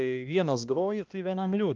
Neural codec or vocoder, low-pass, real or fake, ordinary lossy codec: codec, 16 kHz, 1 kbps, X-Codec, HuBERT features, trained on balanced general audio; 7.2 kHz; fake; Opus, 24 kbps